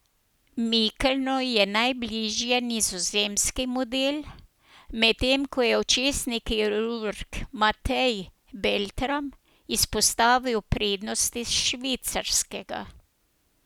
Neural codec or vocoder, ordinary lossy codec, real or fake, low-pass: none; none; real; none